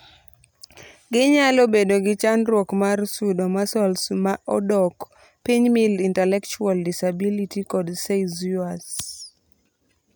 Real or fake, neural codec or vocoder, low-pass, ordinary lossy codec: real; none; none; none